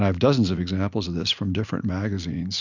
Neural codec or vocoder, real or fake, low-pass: none; real; 7.2 kHz